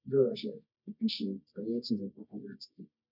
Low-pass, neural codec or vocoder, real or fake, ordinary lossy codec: 5.4 kHz; codec, 24 kHz, 0.9 kbps, WavTokenizer, medium music audio release; fake; none